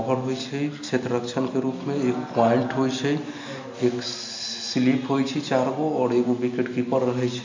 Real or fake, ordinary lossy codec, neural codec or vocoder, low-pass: real; MP3, 48 kbps; none; 7.2 kHz